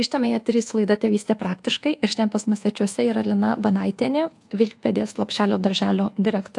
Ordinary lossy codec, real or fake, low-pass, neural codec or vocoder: AAC, 64 kbps; fake; 10.8 kHz; codec, 24 kHz, 1.2 kbps, DualCodec